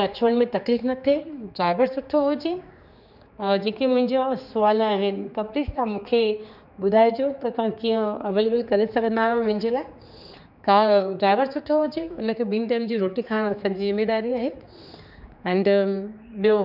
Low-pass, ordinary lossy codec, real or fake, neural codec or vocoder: 5.4 kHz; none; fake; codec, 16 kHz, 4 kbps, X-Codec, HuBERT features, trained on general audio